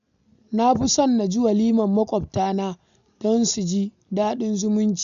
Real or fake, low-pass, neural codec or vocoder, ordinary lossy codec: real; 7.2 kHz; none; none